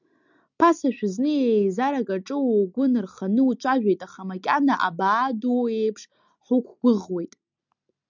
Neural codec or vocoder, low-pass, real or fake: none; 7.2 kHz; real